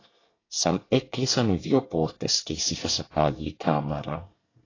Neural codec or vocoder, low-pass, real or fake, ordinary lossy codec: codec, 24 kHz, 1 kbps, SNAC; 7.2 kHz; fake; AAC, 32 kbps